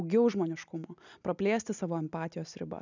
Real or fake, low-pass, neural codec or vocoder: real; 7.2 kHz; none